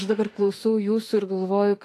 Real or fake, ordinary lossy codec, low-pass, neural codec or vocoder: fake; AAC, 48 kbps; 14.4 kHz; autoencoder, 48 kHz, 32 numbers a frame, DAC-VAE, trained on Japanese speech